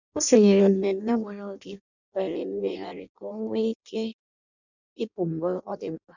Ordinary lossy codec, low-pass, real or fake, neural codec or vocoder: none; 7.2 kHz; fake; codec, 16 kHz in and 24 kHz out, 0.6 kbps, FireRedTTS-2 codec